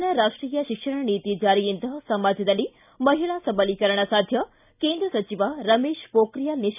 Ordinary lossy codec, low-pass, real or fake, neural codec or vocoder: none; 3.6 kHz; real; none